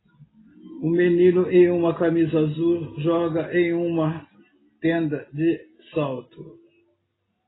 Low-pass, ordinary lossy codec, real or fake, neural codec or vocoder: 7.2 kHz; AAC, 16 kbps; real; none